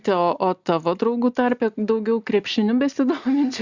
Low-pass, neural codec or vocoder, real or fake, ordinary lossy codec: 7.2 kHz; none; real; Opus, 64 kbps